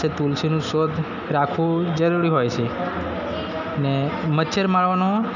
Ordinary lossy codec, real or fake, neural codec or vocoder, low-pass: none; real; none; 7.2 kHz